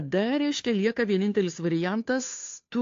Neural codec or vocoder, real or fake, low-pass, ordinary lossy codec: codec, 16 kHz, 2 kbps, FunCodec, trained on Chinese and English, 25 frames a second; fake; 7.2 kHz; AAC, 48 kbps